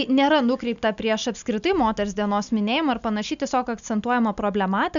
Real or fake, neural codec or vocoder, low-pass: real; none; 7.2 kHz